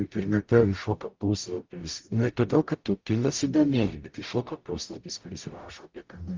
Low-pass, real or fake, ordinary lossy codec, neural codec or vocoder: 7.2 kHz; fake; Opus, 24 kbps; codec, 44.1 kHz, 0.9 kbps, DAC